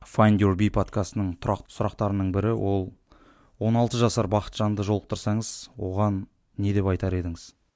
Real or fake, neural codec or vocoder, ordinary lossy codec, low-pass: real; none; none; none